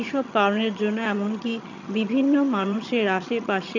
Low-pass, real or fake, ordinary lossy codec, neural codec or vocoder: 7.2 kHz; fake; none; vocoder, 22.05 kHz, 80 mel bands, HiFi-GAN